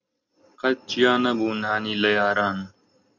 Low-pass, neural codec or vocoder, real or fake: 7.2 kHz; none; real